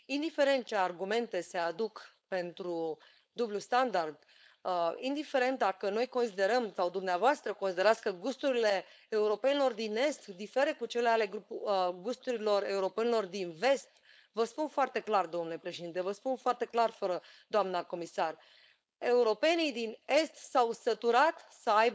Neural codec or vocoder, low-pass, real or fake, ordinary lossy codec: codec, 16 kHz, 4.8 kbps, FACodec; none; fake; none